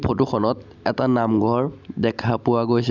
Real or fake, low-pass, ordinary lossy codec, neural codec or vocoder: real; 7.2 kHz; none; none